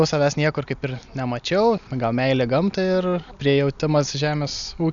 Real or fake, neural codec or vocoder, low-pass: real; none; 7.2 kHz